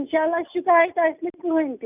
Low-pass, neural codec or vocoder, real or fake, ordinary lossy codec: 3.6 kHz; none; real; none